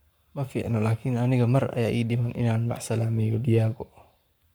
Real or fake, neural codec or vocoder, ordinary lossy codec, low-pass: fake; codec, 44.1 kHz, 7.8 kbps, Pupu-Codec; none; none